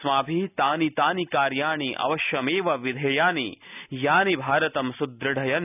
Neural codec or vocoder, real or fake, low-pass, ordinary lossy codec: none; real; 3.6 kHz; none